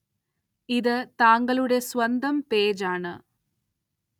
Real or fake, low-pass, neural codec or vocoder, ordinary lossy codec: real; 19.8 kHz; none; none